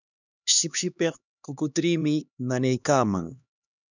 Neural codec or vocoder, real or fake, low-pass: codec, 16 kHz, 2 kbps, X-Codec, HuBERT features, trained on LibriSpeech; fake; 7.2 kHz